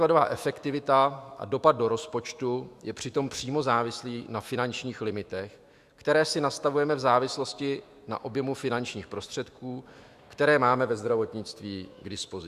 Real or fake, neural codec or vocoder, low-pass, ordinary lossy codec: fake; autoencoder, 48 kHz, 128 numbers a frame, DAC-VAE, trained on Japanese speech; 14.4 kHz; Opus, 64 kbps